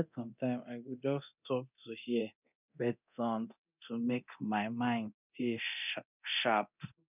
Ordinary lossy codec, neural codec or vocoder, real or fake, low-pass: none; codec, 24 kHz, 0.9 kbps, DualCodec; fake; 3.6 kHz